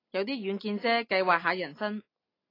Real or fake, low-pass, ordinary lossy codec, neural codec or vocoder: real; 5.4 kHz; AAC, 24 kbps; none